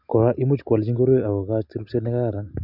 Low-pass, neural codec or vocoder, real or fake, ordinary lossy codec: 5.4 kHz; none; real; none